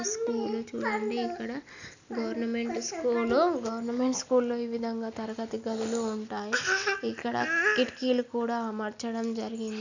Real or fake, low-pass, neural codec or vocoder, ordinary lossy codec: real; 7.2 kHz; none; none